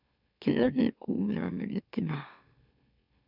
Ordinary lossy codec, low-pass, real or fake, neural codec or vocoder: none; 5.4 kHz; fake; autoencoder, 44.1 kHz, a latent of 192 numbers a frame, MeloTTS